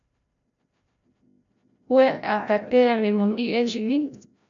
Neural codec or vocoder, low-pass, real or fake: codec, 16 kHz, 0.5 kbps, FreqCodec, larger model; 7.2 kHz; fake